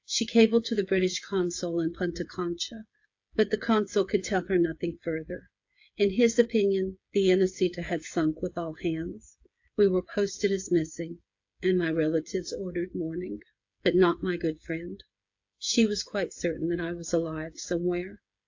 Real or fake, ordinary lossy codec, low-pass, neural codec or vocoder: fake; AAC, 48 kbps; 7.2 kHz; codec, 16 kHz, 8 kbps, FreqCodec, smaller model